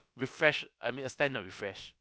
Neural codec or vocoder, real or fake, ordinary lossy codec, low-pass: codec, 16 kHz, about 1 kbps, DyCAST, with the encoder's durations; fake; none; none